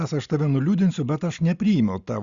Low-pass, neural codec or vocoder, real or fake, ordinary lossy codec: 7.2 kHz; none; real; Opus, 64 kbps